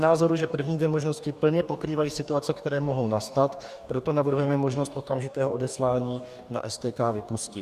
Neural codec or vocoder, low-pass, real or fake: codec, 44.1 kHz, 2.6 kbps, DAC; 14.4 kHz; fake